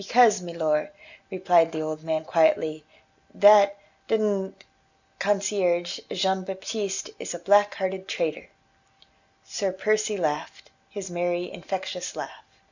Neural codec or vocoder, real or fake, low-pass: none; real; 7.2 kHz